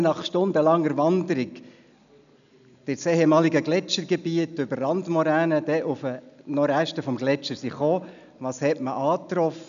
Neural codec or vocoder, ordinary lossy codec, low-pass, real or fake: none; none; 7.2 kHz; real